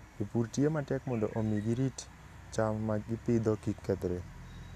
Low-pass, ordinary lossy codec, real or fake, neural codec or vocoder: 14.4 kHz; none; real; none